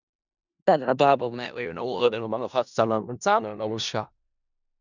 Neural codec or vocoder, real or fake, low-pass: codec, 16 kHz in and 24 kHz out, 0.4 kbps, LongCat-Audio-Codec, four codebook decoder; fake; 7.2 kHz